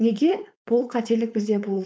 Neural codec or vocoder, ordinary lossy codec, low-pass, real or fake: codec, 16 kHz, 4.8 kbps, FACodec; none; none; fake